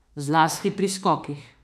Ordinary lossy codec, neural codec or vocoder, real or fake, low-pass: none; autoencoder, 48 kHz, 32 numbers a frame, DAC-VAE, trained on Japanese speech; fake; 14.4 kHz